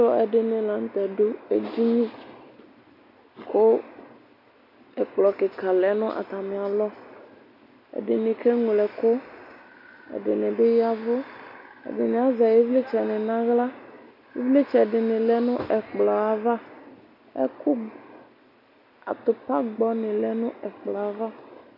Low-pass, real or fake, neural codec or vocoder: 5.4 kHz; real; none